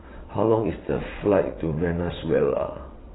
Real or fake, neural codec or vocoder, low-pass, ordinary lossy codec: fake; vocoder, 22.05 kHz, 80 mel bands, WaveNeXt; 7.2 kHz; AAC, 16 kbps